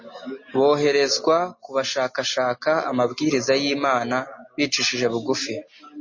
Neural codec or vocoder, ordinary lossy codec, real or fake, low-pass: none; MP3, 32 kbps; real; 7.2 kHz